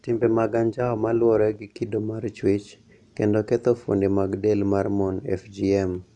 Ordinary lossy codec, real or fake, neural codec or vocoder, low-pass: none; real; none; 10.8 kHz